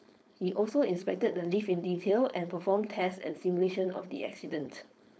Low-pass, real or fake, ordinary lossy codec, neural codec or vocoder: none; fake; none; codec, 16 kHz, 4.8 kbps, FACodec